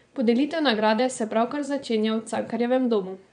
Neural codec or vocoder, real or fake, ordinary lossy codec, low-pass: vocoder, 22.05 kHz, 80 mel bands, Vocos; fake; none; 9.9 kHz